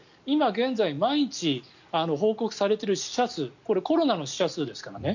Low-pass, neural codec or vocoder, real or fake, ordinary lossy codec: 7.2 kHz; none; real; none